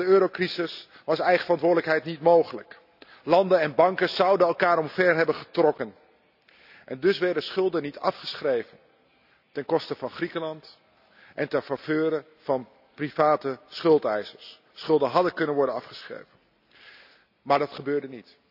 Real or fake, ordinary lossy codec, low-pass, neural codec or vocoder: real; none; 5.4 kHz; none